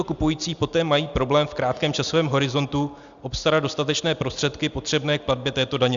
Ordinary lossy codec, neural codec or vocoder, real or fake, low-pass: Opus, 64 kbps; none; real; 7.2 kHz